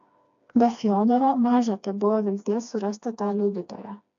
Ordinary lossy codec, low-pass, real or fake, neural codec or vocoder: AAC, 64 kbps; 7.2 kHz; fake; codec, 16 kHz, 2 kbps, FreqCodec, smaller model